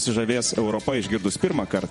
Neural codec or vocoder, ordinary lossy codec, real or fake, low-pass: vocoder, 48 kHz, 128 mel bands, Vocos; MP3, 48 kbps; fake; 19.8 kHz